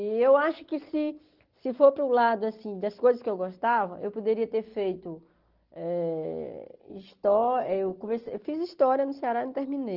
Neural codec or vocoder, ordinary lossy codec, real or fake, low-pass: none; Opus, 16 kbps; real; 5.4 kHz